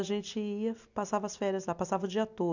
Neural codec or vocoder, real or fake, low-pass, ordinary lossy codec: none; real; 7.2 kHz; none